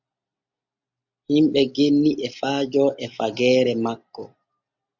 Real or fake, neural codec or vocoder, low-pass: real; none; 7.2 kHz